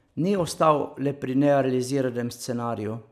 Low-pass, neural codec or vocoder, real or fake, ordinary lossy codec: 14.4 kHz; none; real; none